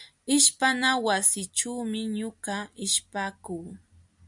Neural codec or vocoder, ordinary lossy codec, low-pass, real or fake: none; MP3, 96 kbps; 10.8 kHz; real